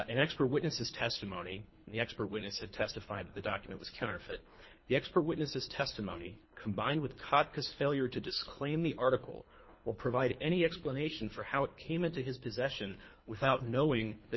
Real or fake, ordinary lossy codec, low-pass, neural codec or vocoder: fake; MP3, 24 kbps; 7.2 kHz; codec, 24 kHz, 3 kbps, HILCodec